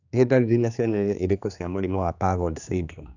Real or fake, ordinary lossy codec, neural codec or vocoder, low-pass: fake; none; codec, 16 kHz, 2 kbps, X-Codec, HuBERT features, trained on general audio; 7.2 kHz